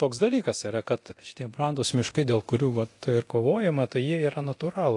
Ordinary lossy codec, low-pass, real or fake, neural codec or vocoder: AAC, 48 kbps; 10.8 kHz; fake; codec, 24 kHz, 0.9 kbps, DualCodec